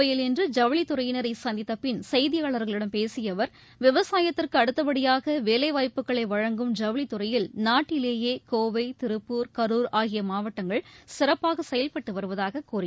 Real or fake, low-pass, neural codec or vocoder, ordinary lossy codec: real; none; none; none